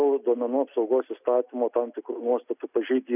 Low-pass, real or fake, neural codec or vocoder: 3.6 kHz; real; none